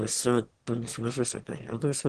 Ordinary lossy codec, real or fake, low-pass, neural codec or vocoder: Opus, 16 kbps; fake; 9.9 kHz; autoencoder, 22.05 kHz, a latent of 192 numbers a frame, VITS, trained on one speaker